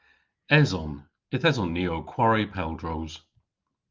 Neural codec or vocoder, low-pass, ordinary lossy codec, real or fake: none; 7.2 kHz; Opus, 24 kbps; real